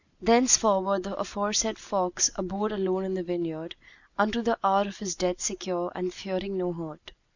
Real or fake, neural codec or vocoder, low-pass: real; none; 7.2 kHz